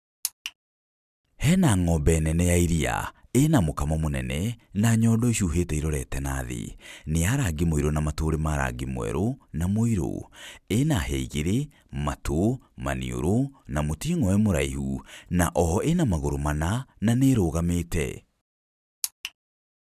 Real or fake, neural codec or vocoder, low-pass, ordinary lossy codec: real; none; 14.4 kHz; none